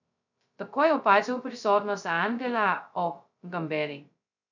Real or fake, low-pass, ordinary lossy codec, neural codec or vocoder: fake; 7.2 kHz; none; codec, 16 kHz, 0.2 kbps, FocalCodec